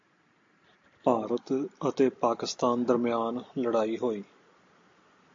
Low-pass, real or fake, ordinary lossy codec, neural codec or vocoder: 7.2 kHz; real; AAC, 48 kbps; none